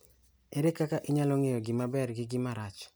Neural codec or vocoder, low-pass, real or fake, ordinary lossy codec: none; none; real; none